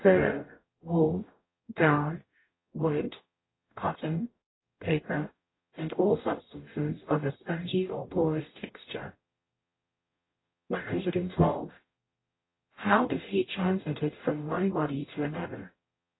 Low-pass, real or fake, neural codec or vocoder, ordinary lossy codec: 7.2 kHz; fake; codec, 44.1 kHz, 0.9 kbps, DAC; AAC, 16 kbps